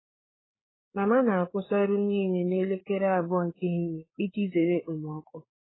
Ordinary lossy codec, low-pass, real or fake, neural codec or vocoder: AAC, 16 kbps; 7.2 kHz; fake; codec, 16 kHz, 4 kbps, FreqCodec, larger model